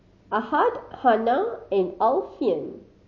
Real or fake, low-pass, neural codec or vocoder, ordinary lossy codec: real; 7.2 kHz; none; MP3, 32 kbps